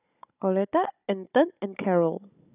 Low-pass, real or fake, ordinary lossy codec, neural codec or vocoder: 3.6 kHz; fake; none; codec, 16 kHz, 16 kbps, FunCodec, trained on Chinese and English, 50 frames a second